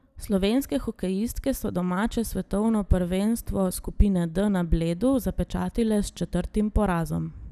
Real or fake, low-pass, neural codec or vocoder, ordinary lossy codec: real; 14.4 kHz; none; none